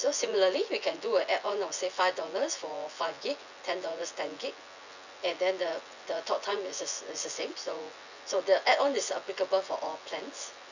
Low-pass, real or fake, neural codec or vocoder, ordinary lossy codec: 7.2 kHz; fake; vocoder, 24 kHz, 100 mel bands, Vocos; none